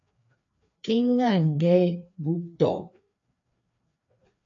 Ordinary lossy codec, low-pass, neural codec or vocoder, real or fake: AAC, 64 kbps; 7.2 kHz; codec, 16 kHz, 2 kbps, FreqCodec, larger model; fake